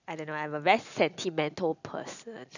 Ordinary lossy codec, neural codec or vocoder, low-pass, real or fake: none; none; 7.2 kHz; real